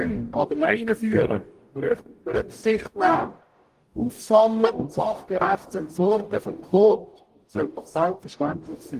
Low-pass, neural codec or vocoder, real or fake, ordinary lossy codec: 14.4 kHz; codec, 44.1 kHz, 0.9 kbps, DAC; fake; Opus, 24 kbps